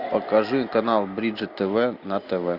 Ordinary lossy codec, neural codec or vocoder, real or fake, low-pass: Opus, 64 kbps; none; real; 5.4 kHz